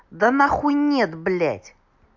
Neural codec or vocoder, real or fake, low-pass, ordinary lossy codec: none; real; 7.2 kHz; MP3, 64 kbps